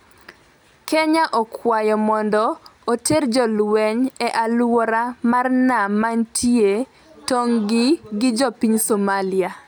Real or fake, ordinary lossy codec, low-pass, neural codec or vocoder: real; none; none; none